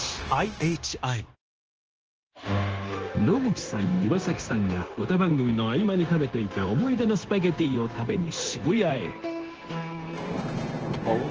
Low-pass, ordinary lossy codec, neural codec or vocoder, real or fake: 7.2 kHz; Opus, 16 kbps; codec, 16 kHz, 0.9 kbps, LongCat-Audio-Codec; fake